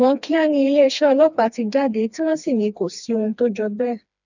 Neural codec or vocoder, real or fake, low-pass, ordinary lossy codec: codec, 16 kHz, 2 kbps, FreqCodec, smaller model; fake; 7.2 kHz; none